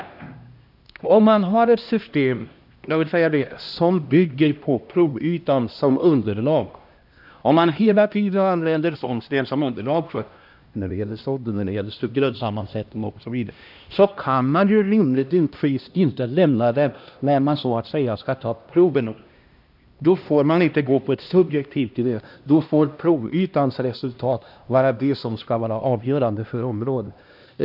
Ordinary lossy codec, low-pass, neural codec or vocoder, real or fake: AAC, 48 kbps; 5.4 kHz; codec, 16 kHz, 1 kbps, X-Codec, HuBERT features, trained on LibriSpeech; fake